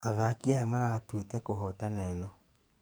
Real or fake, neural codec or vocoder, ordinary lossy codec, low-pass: fake; codec, 44.1 kHz, 2.6 kbps, SNAC; none; none